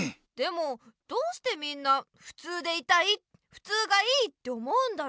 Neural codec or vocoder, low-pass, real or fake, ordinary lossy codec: none; none; real; none